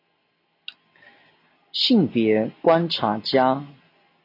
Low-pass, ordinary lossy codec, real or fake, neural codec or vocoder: 5.4 kHz; AAC, 32 kbps; real; none